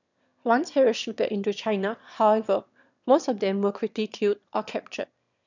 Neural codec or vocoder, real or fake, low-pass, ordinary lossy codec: autoencoder, 22.05 kHz, a latent of 192 numbers a frame, VITS, trained on one speaker; fake; 7.2 kHz; none